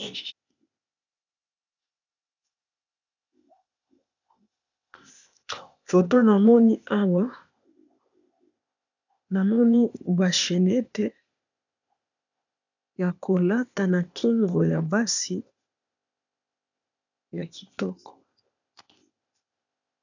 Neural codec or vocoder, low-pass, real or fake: codec, 16 kHz, 0.8 kbps, ZipCodec; 7.2 kHz; fake